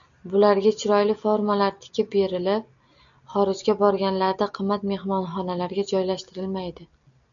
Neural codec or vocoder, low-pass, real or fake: none; 7.2 kHz; real